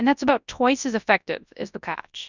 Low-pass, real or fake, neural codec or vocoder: 7.2 kHz; fake; codec, 24 kHz, 0.9 kbps, WavTokenizer, large speech release